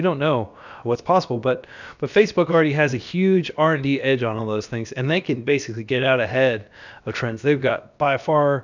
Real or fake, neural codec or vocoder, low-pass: fake; codec, 16 kHz, about 1 kbps, DyCAST, with the encoder's durations; 7.2 kHz